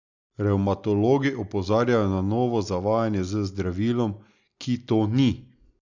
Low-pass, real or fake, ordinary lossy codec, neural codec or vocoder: 7.2 kHz; real; none; none